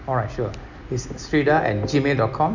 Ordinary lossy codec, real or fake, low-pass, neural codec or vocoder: none; real; 7.2 kHz; none